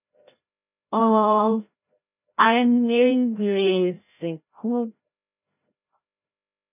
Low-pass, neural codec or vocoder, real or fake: 3.6 kHz; codec, 16 kHz, 0.5 kbps, FreqCodec, larger model; fake